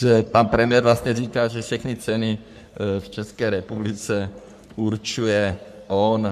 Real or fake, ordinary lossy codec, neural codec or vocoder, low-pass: fake; MP3, 96 kbps; codec, 44.1 kHz, 3.4 kbps, Pupu-Codec; 14.4 kHz